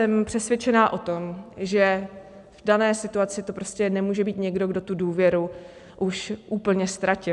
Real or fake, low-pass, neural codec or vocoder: real; 10.8 kHz; none